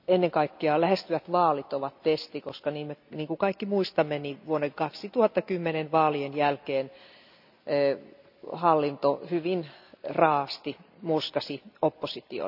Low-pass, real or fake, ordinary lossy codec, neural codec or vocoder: 5.4 kHz; real; none; none